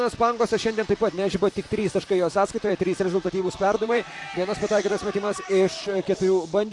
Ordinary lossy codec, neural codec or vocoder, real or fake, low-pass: AAC, 64 kbps; vocoder, 44.1 kHz, 128 mel bands, Pupu-Vocoder; fake; 10.8 kHz